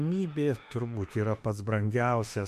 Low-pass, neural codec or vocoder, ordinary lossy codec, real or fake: 14.4 kHz; autoencoder, 48 kHz, 32 numbers a frame, DAC-VAE, trained on Japanese speech; MP3, 64 kbps; fake